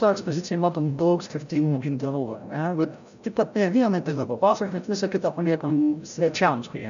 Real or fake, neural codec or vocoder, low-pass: fake; codec, 16 kHz, 0.5 kbps, FreqCodec, larger model; 7.2 kHz